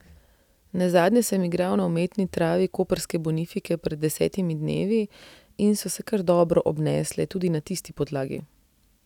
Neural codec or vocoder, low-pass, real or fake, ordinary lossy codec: none; 19.8 kHz; real; none